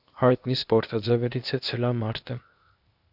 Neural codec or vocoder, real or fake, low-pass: codec, 16 kHz, 0.8 kbps, ZipCodec; fake; 5.4 kHz